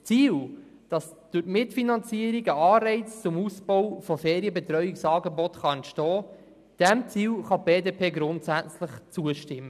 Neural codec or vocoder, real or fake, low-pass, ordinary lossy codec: none; real; 14.4 kHz; none